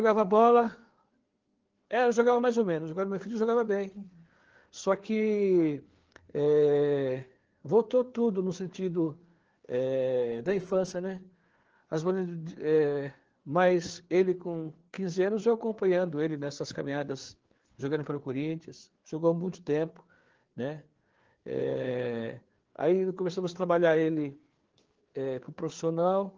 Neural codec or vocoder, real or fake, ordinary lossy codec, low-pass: codec, 16 kHz, 4 kbps, FreqCodec, larger model; fake; Opus, 16 kbps; 7.2 kHz